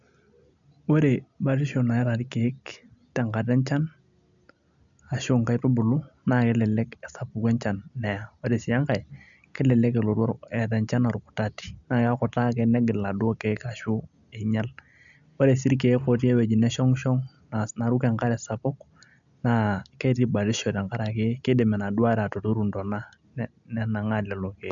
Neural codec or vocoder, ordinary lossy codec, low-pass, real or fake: none; none; 7.2 kHz; real